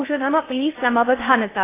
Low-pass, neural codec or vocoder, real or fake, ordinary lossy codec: 3.6 kHz; codec, 16 kHz in and 24 kHz out, 0.6 kbps, FocalCodec, streaming, 2048 codes; fake; AAC, 16 kbps